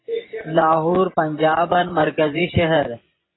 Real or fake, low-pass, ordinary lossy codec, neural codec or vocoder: fake; 7.2 kHz; AAC, 16 kbps; vocoder, 24 kHz, 100 mel bands, Vocos